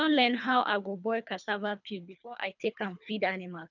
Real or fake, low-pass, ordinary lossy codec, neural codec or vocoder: fake; 7.2 kHz; none; codec, 24 kHz, 3 kbps, HILCodec